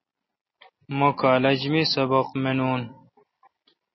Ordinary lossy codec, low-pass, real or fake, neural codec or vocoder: MP3, 24 kbps; 7.2 kHz; real; none